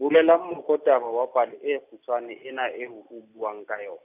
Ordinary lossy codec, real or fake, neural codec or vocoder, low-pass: none; real; none; 3.6 kHz